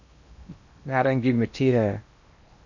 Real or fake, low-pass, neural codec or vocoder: fake; 7.2 kHz; codec, 16 kHz in and 24 kHz out, 0.8 kbps, FocalCodec, streaming, 65536 codes